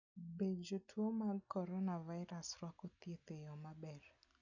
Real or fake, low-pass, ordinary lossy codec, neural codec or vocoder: real; 7.2 kHz; none; none